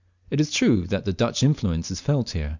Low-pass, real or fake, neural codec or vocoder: 7.2 kHz; real; none